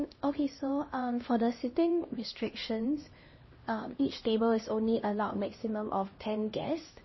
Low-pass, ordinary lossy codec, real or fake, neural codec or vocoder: 7.2 kHz; MP3, 24 kbps; fake; codec, 16 kHz, 1 kbps, X-Codec, HuBERT features, trained on LibriSpeech